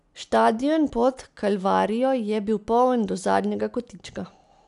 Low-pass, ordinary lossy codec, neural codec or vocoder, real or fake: 10.8 kHz; none; none; real